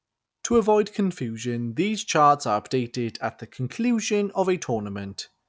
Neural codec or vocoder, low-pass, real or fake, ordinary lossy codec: none; none; real; none